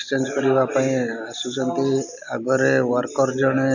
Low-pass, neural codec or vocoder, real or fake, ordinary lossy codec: 7.2 kHz; none; real; none